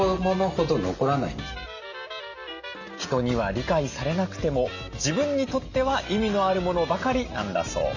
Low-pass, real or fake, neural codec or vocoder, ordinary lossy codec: 7.2 kHz; real; none; none